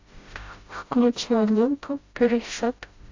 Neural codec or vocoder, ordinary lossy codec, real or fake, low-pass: codec, 16 kHz, 0.5 kbps, FreqCodec, smaller model; AAC, 48 kbps; fake; 7.2 kHz